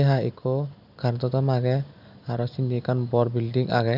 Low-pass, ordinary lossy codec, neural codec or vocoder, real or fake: 5.4 kHz; none; none; real